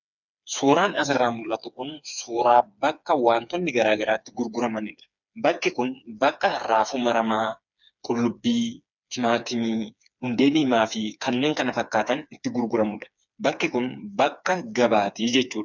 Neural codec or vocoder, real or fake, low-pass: codec, 16 kHz, 4 kbps, FreqCodec, smaller model; fake; 7.2 kHz